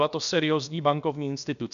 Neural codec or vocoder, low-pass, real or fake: codec, 16 kHz, about 1 kbps, DyCAST, with the encoder's durations; 7.2 kHz; fake